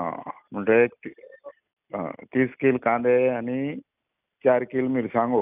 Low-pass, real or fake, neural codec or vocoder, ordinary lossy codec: 3.6 kHz; real; none; none